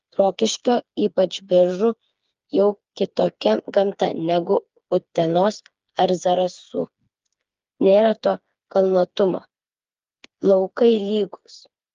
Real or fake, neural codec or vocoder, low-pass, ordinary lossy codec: fake; codec, 16 kHz, 4 kbps, FreqCodec, smaller model; 7.2 kHz; Opus, 32 kbps